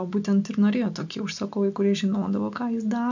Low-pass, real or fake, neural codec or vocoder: 7.2 kHz; real; none